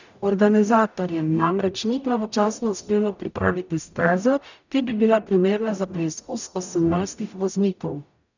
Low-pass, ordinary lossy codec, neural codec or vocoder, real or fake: 7.2 kHz; none; codec, 44.1 kHz, 0.9 kbps, DAC; fake